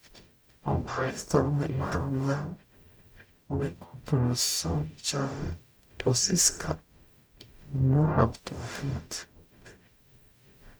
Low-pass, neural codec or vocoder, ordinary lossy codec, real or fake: none; codec, 44.1 kHz, 0.9 kbps, DAC; none; fake